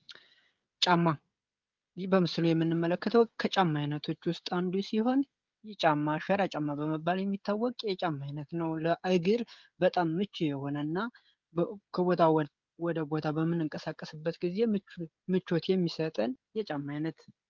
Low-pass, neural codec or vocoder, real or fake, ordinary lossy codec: 7.2 kHz; codec, 16 kHz, 16 kbps, FreqCodec, smaller model; fake; Opus, 24 kbps